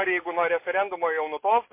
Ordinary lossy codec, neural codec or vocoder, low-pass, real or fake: MP3, 24 kbps; none; 3.6 kHz; real